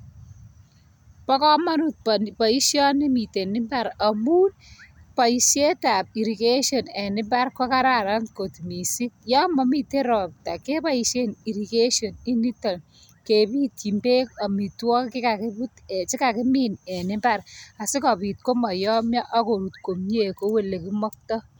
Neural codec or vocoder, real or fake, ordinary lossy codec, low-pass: none; real; none; none